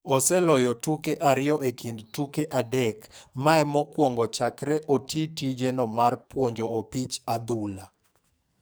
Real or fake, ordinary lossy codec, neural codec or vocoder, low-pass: fake; none; codec, 44.1 kHz, 2.6 kbps, SNAC; none